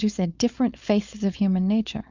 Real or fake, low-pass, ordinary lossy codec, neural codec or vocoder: fake; 7.2 kHz; Opus, 64 kbps; codec, 16 kHz, 4.8 kbps, FACodec